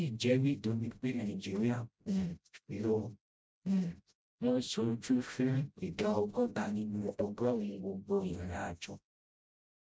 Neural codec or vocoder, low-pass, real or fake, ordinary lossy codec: codec, 16 kHz, 0.5 kbps, FreqCodec, smaller model; none; fake; none